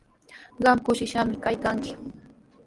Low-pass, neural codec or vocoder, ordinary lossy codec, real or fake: 10.8 kHz; none; Opus, 24 kbps; real